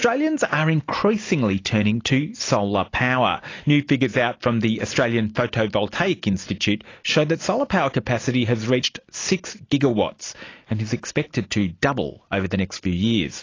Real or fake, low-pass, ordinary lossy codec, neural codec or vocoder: real; 7.2 kHz; AAC, 32 kbps; none